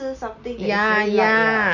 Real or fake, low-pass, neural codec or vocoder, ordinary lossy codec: real; 7.2 kHz; none; none